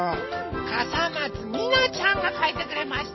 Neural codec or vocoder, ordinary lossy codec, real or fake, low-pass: vocoder, 44.1 kHz, 128 mel bands every 256 samples, BigVGAN v2; MP3, 24 kbps; fake; 7.2 kHz